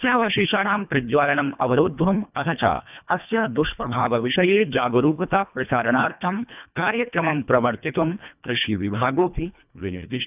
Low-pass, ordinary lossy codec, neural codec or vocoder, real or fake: 3.6 kHz; none; codec, 24 kHz, 1.5 kbps, HILCodec; fake